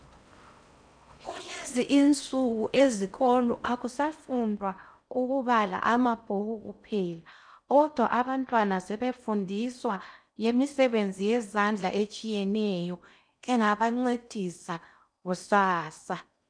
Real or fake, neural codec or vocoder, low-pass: fake; codec, 16 kHz in and 24 kHz out, 0.6 kbps, FocalCodec, streaming, 4096 codes; 9.9 kHz